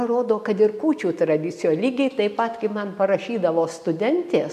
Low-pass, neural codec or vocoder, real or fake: 14.4 kHz; none; real